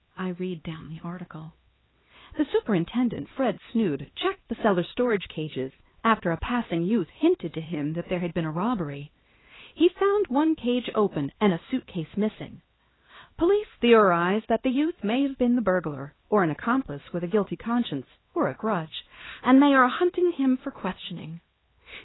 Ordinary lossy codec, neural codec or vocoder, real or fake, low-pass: AAC, 16 kbps; codec, 16 kHz, 2 kbps, X-Codec, HuBERT features, trained on LibriSpeech; fake; 7.2 kHz